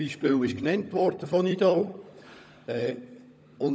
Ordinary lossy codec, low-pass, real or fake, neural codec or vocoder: none; none; fake; codec, 16 kHz, 16 kbps, FunCodec, trained on LibriTTS, 50 frames a second